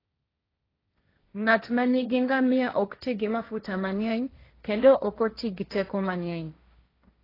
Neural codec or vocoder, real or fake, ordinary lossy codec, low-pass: codec, 16 kHz, 1.1 kbps, Voila-Tokenizer; fake; AAC, 24 kbps; 5.4 kHz